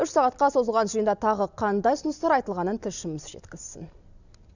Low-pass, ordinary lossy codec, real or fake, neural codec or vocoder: 7.2 kHz; none; real; none